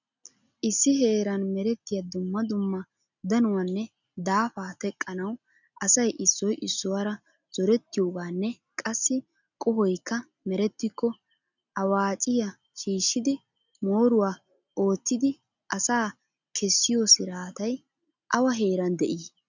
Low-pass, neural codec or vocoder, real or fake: 7.2 kHz; none; real